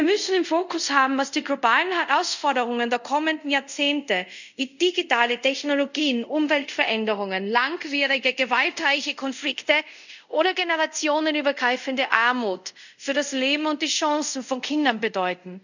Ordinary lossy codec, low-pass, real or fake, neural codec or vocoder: none; 7.2 kHz; fake; codec, 24 kHz, 0.5 kbps, DualCodec